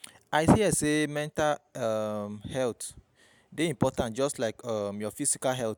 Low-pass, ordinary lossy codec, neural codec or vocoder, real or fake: none; none; none; real